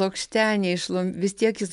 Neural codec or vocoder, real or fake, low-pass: none; real; 10.8 kHz